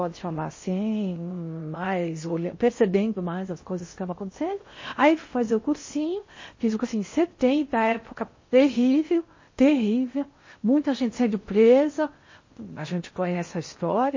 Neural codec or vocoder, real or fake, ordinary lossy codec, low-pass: codec, 16 kHz in and 24 kHz out, 0.6 kbps, FocalCodec, streaming, 4096 codes; fake; MP3, 32 kbps; 7.2 kHz